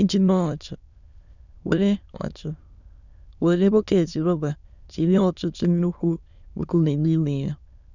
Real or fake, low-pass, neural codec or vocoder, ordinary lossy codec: fake; 7.2 kHz; autoencoder, 22.05 kHz, a latent of 192 numbers a frame, VITS, trained on many speakers; none